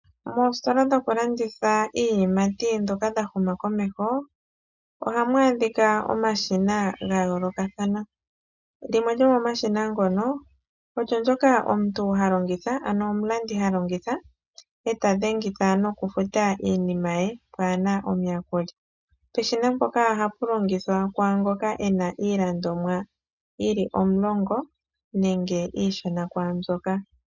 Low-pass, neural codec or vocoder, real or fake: 7.2 kHz; none; real